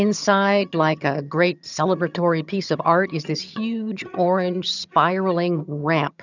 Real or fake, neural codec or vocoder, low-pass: fake; vocoder, 22.05 kHz, 80 mel bands, HiFi-GAN; 7.2 kHz